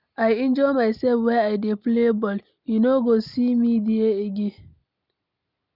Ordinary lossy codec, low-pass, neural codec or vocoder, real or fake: none; 5.4 kHz; none; real